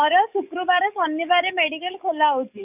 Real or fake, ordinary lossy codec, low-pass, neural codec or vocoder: fake; none; 3.6 kHz; codec, 44.1 kHz, 7.8 kbps, DAC